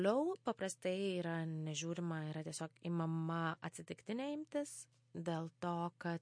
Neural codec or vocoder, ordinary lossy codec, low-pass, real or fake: none; MP3, 48 kbps; 9.9 kHz; real